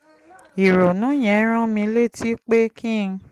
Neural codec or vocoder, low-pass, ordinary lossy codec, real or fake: none; 14.4 kHz; Opus, 24 kbps; real